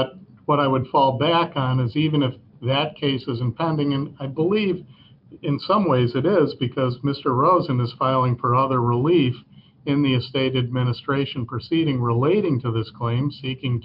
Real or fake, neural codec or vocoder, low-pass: real; none; 5.4 kHz